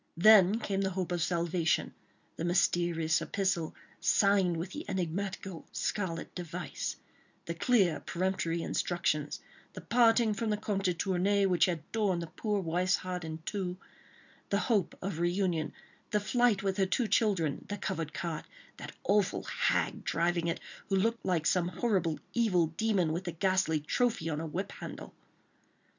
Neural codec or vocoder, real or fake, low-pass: none; real; 7.2 kHz